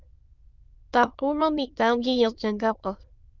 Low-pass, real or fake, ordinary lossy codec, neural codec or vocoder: 7.2 kHz; fake; Opus, 24 kbps; autoencoder, 22.05 kHz, a latent of 192 numbers a frame, VITS, trained on many speakers